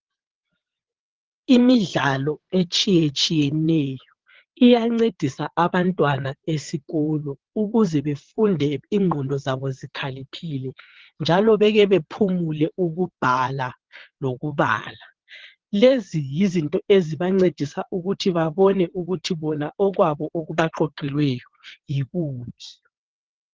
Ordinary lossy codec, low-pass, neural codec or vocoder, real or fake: Opus, 16 kbps; 7.2 kHz; vocoder, 22.05 kHz, 80 mel bands, WaveNeXt; fake